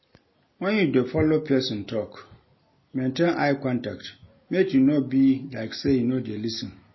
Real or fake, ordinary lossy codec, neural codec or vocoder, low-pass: real; MP3, 24 kbps; none; 7.2 kHz